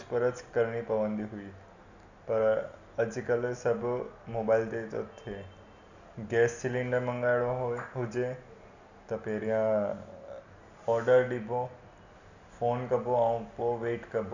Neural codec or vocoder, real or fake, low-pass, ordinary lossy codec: none; real; 7.2 kHz; none